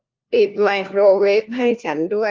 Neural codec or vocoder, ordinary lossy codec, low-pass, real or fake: codec, 16 kHz, 1 kbps, FunCodec, trained on LibriTTS, 50 frames a second; Opus, 24 kbps; 7.2 kHz; fake